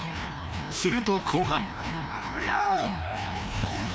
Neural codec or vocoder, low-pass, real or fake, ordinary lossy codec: codec, 16 kHz, 1 kbps, FreqCodec, larger model; none; fake; none